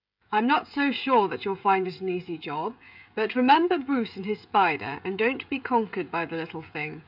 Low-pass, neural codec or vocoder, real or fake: 5.4 kHz; codec, 16 kHz, 16 kbps, FreqCodec, smaller model; fake